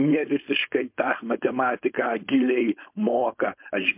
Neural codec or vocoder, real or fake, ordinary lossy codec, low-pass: codec, 16 kHz, 4.8 kbps, FACodec; fake; MP3, 32 kbps; 3.6 kHz